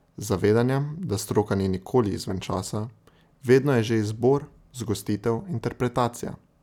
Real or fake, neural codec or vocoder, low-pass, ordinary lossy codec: real; none; 19.8 kHz; none